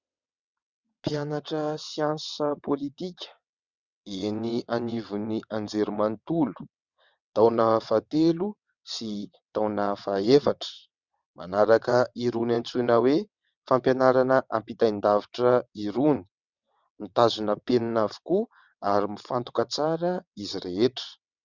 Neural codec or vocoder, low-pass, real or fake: vocoder, 22.05 kHz, 80 mel bands, WaveNeXt; 7.2 kHz; fake